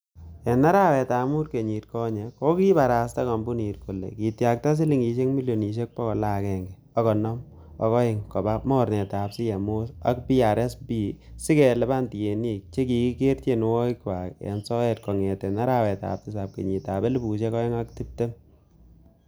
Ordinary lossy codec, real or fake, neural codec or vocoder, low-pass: none; real; none; none